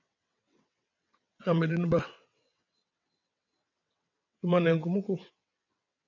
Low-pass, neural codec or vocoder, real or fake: 7.2 kHz; vocoder, 22.05 kHz, 80 mel bands, WaveNeXt; fake